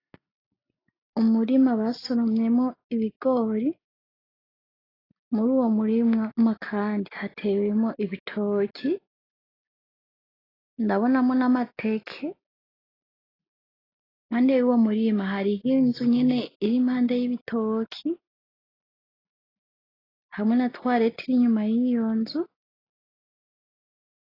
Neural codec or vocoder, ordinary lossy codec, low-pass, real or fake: none; AAC, 24 kbps; 5.4 kHz; real